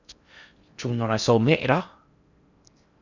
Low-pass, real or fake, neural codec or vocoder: 7.2 kHz; fake; codec, 16 kHz in and 24 kHz out, 0.6 kbps, FocalCodec, streaming, 4096 codes